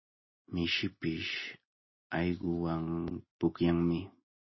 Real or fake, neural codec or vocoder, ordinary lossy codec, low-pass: real; none; MP3, 24 kbps; 7.2 kHz